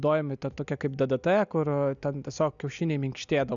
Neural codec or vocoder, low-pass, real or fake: none; 7.2 kHz; real